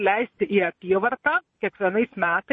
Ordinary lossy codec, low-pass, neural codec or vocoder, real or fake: MP3, 32 kbps; 9.9 kHz; vocoder, 22.05 kHz, 80 mel bands, Vocos; fake